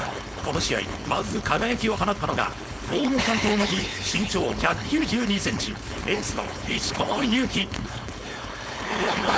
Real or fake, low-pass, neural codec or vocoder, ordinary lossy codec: fake; none; codec, 16 kHz, 4.8 kbps, FACodec; none